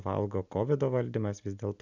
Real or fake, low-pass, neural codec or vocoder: real; 7.2 kHz; none